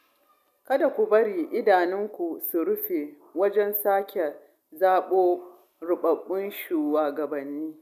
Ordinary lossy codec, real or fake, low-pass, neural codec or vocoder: none; real; 14.4 kHz; none